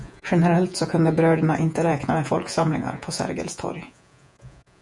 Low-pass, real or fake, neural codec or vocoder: 10.8 kHz; fake; vocoder, 48 kHz, 128 mel bands, Vocos